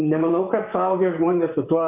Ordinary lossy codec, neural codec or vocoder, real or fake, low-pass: MP3, 32 kbps; vocoder, 44.1 kHz, 128 mel bands, Pupu-Vocoder; fake; 3.6 kHz